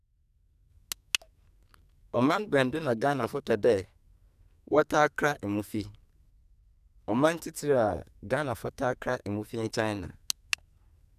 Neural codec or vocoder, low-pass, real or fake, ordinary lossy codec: codec, 44.1 kHz, 2.6 kbps, SNAC; 14.4 kHz; fake; none